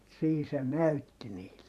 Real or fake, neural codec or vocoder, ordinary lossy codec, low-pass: fake; vocoder, 44.1 kHz, 128 mel bands every 512 samples, BigVGAN v2; none; 14.4 kHz